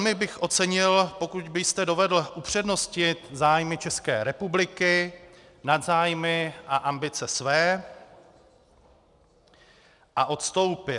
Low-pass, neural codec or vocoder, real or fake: 10.8 kHz; none; real